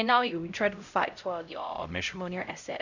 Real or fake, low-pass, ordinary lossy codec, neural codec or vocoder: fake; 7.2 kHz; none; codec, 16 kHz, 0.5 kbps, X-Codec, HuBERT features, trained on LibriSpeech